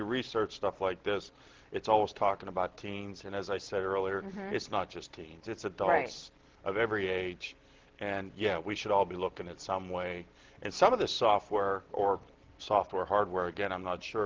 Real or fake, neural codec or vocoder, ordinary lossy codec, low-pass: real; none; Opus, 16 kbps; 7.2 kHz